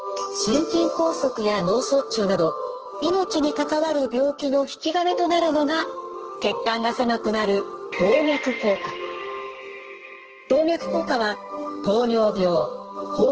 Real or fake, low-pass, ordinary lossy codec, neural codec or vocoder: fake; 7.2 kHz; Opus, 16 kbps; codec, 32 kHz, 1.9 kbps, SNAC